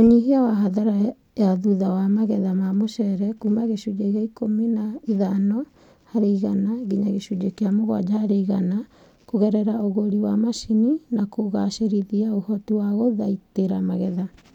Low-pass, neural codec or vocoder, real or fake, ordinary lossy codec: 19.8 kHz; none; real; none